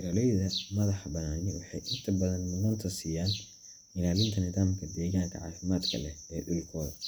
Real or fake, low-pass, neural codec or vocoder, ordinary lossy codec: real; none; none; none